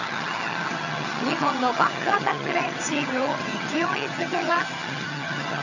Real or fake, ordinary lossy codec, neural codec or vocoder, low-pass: fake; none; vocoder, 22.05 kHz, 80 mel bands, HiFi-GAN; 7.2 kHz